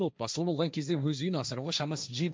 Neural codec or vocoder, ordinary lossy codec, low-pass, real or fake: codec, 16 kHz, 1.1 kbps, Voila-Tokenizer; none; none; fake